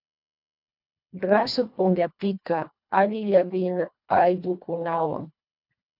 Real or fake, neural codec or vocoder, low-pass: fake; codec, 24 kHz, 1.5 kbps, HILCodec; 5.4 kHz